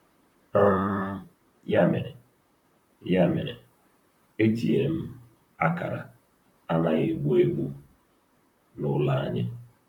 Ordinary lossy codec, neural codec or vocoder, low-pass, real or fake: none; vocoder, 44.1 kHz, 128 mel bands, Pupu-Vocoder; 19.8 kHz; fake